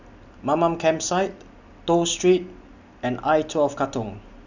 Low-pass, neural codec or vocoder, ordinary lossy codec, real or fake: 7.2 kHz; none; none; real